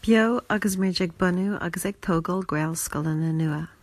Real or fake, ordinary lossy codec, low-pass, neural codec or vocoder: real; AAC, 64 kbps; 14.4 kHz; none